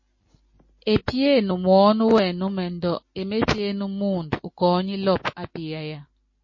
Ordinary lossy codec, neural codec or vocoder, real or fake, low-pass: MP3, 32 kbps; none; real; 7.2 kHz